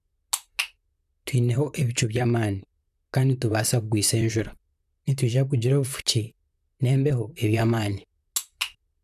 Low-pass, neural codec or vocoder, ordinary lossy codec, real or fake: 14.4 kHz; vocoder, 44.1 kHz, 128 mel bands, Pupu-Vocoder; none; fake